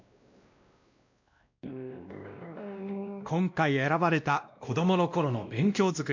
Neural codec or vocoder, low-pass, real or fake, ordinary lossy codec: codec, 16 kHz, 1 kbps, X-Codec, WavLM features, trained on Multilingual LibriSpeech; 7.2 kHz; fake; MP3, 64 kbps